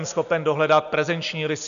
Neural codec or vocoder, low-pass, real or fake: none; 7.2 kHz; real